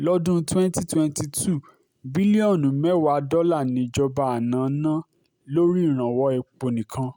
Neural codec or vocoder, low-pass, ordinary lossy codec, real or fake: none; none; none; real